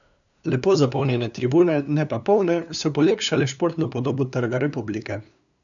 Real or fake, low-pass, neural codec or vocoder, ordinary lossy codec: fake; 7.2 kHz; codec, 16 kHz, 2 kbps, FunCodec, trained on LibriTTS, 25 frames a second; none